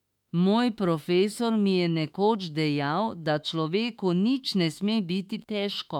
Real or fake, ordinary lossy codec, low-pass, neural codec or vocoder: fake; none; 19.8 kHz; autoencoder, 48 kHz, 32 numbers a frame, DAC-VAE, trained on Japanese speech